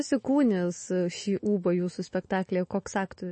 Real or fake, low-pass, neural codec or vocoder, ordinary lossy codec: fake; 10.8 kHz; autoencoder, 48 kHz, 128 numbers a frame, DAC-VAE, trained on Japanese speech; MP3, 32 kbps